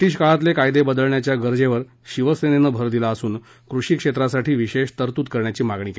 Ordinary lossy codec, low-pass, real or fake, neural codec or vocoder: none; none; real; none